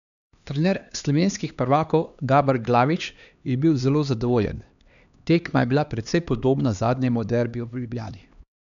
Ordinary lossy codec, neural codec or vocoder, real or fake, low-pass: none; codec, 16 kHz, 2 kbps, X-Codec, HuBERT features, trained on LibriSpeech; fake; 7.2 kHz